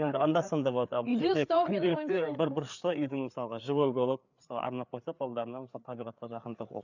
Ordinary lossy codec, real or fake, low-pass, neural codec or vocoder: none; fake; 7.2 kHz; codec, 16 kHz, 4 kbps, FreqCodec, larger model